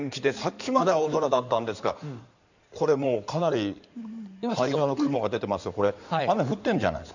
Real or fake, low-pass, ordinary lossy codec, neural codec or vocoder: fake; 7.2 kHz; none; codec, 16 kHz in and 24 kHz out, 2.2 kbps, FireRedTTS-2 codec